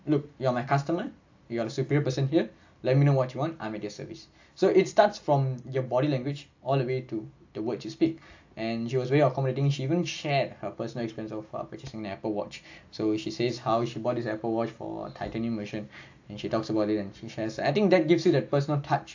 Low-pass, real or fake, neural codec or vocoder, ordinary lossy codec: 7.2 kHz; real; none; AAC, 48 kbps